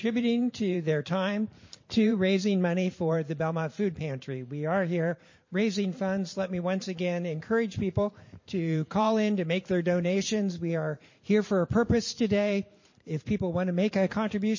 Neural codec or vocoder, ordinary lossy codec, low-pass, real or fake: vocoder, 44.1 kHz, 128 mel bands every 512 samples, BigVGAN v2; MP3, 32 kbps; 7.2 kHz; fake